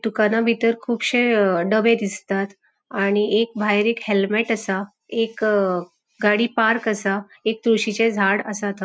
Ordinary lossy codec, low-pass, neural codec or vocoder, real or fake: none; none; none; real